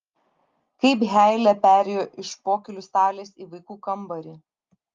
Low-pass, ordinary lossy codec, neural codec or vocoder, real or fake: 7.2 kHz; Opus, 32 kbps; none; real